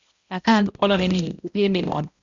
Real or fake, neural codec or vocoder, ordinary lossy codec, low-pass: fake; codec, 16 kHz, 0.5 kbps, X-Codec, HuBERT features, trained on balanced general audio; Opus, 64 kbps; 7.2 kHz